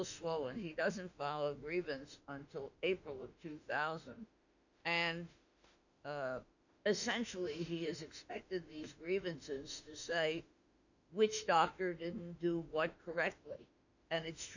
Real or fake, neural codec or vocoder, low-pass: fake; autoencoder, 48 kHz, 32 numbers a frame, DAC-VAE, trained on Japanese speech; 7.2 kHz